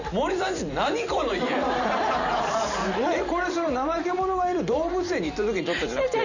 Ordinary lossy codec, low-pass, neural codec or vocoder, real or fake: none; 7.2 kHz; vocoder, 44.1 kHz, 128 mel bands every 512 samples, BigVGAN v2; fake